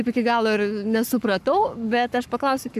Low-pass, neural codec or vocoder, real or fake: 14.4 kHz; codec, 44.1 kHz, 7.8 kbps, Pupu-Codec; fake